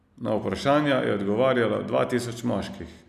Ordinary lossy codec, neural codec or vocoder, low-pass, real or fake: none; none; 14.4 kHz; real